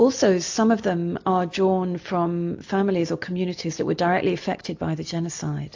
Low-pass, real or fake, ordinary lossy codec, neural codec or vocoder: 7.2 kHz; real; AAC, 48 kbps; none